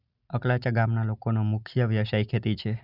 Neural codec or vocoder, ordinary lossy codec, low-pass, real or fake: none; none; 5.4 kHz; real